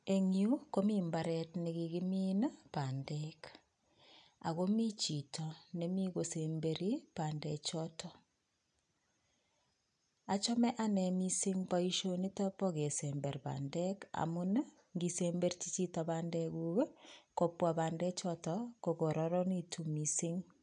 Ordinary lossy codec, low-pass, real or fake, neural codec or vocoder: none; 9.9 kHz; real; none